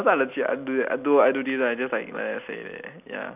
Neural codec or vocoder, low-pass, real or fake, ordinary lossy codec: none; 3.6 kHz; real; none